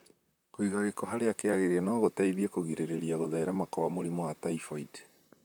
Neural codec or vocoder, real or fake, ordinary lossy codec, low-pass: vocoder, 44.1 kHz, 128 mel bands, Pupu-Vocoder; fake; none; none